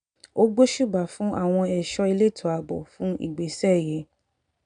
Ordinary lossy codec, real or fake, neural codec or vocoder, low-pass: none; real; none; 9.9 kHz